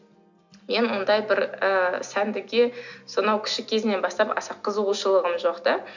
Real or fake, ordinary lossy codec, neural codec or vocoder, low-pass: real; none; none; 7.2 kHz